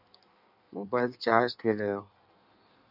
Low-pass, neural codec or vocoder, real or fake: 5.4 kHz; codec, 16 kHz in and 24 kHz out, 1.1 kbps, FireRedTTS-2 codec; fake